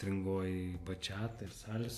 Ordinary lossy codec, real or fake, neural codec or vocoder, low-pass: AAC, 96 kbps; real; none; 14.4 kHz